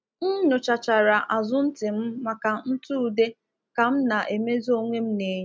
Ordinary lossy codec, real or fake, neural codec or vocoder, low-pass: none; real; none; none